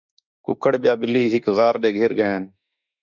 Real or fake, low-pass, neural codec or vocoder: fake; 7.2 kHz; autoencoder, 48 kHz, 32 numbers a frame, DAC-VAE, trained on Japanese speech